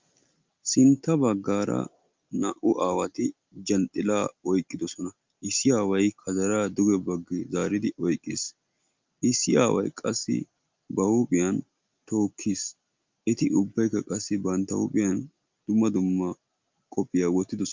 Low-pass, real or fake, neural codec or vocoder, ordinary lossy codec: 7.2 kHz; real; none; Opus, 24 kbps